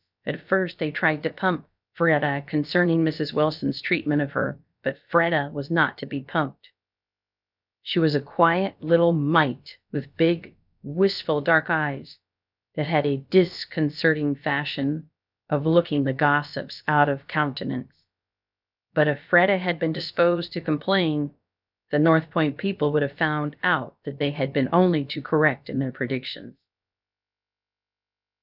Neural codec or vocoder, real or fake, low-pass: codec, 16 kHz, about 1 kbps, DyCAST, with the encoder's durations; fake; 5.4 kHz